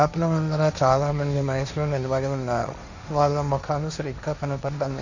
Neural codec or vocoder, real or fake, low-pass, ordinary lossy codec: codec, 16 kHz, 1.1 kbps, Voila-Tokenizer; fake; 7.2 kHz; none